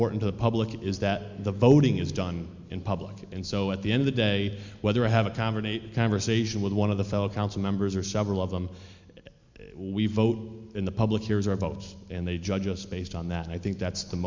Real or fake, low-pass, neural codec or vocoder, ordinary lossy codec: real; 7.2 kHz; none; MP3, 64 kbps